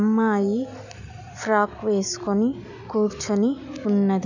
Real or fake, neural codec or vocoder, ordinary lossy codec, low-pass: real; none; none; 7.2 kHz